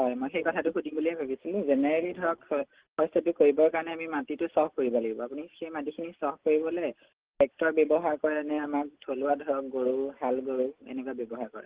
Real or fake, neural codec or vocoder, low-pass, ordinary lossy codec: real; none; 3.6 kHz; Opus, 16 kbps